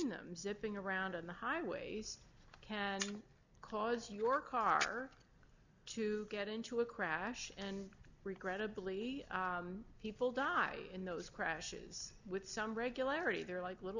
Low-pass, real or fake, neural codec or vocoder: 7.2 kHz; real; none